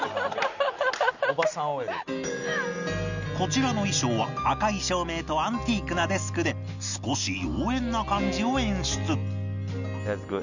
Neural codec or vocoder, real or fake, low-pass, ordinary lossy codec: none; real; 7.2 kHz; none